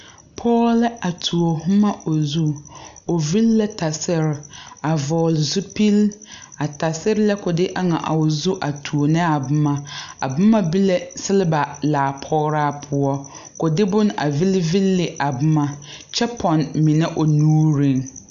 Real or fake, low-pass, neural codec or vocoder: real; 7.2 kHz; none